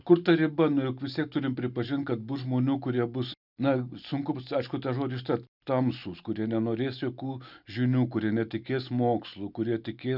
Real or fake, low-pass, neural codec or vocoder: real; 5.4 kHz; none